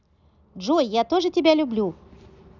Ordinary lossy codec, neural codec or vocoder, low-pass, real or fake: none; none; 7.2 kHz; real